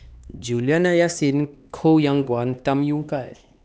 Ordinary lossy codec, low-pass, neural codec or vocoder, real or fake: none; none; codec, 16 kHz, 2 kbps, X-Codec, HuBERT features, trained on LibriSpeech; fake